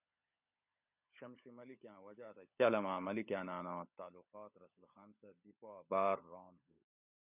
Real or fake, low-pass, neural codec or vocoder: fake; 3.6 kHz; codec, 16 kHz, 16 kbps, FunCodec, trained on LibriTTS, 50 frames a second